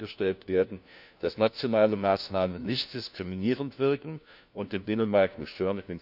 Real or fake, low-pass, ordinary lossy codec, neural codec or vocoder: fake; 5.4 kHz; none; codec, 16 kHz, 1 kbps, FunCodec, trained on LibriTTS, 50 frames a second